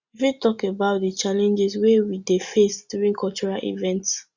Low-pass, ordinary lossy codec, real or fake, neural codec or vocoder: 7.2 kHz; Opus, 64 kbps; real; none